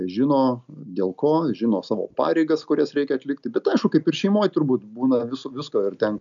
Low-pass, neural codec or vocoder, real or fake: 7.2 kHz; none; real